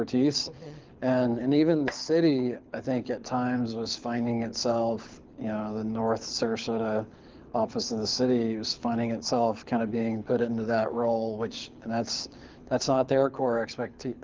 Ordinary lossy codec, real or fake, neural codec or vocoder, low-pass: Opus, 24 kbps; fake; codec, 24 kHz, 6 kbps, HILCodec; 7.2 kHz